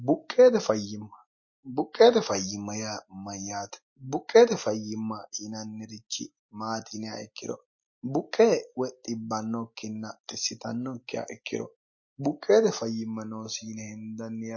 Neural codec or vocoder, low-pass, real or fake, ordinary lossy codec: none; 7.2 kHz; real; MP3, 32 kbps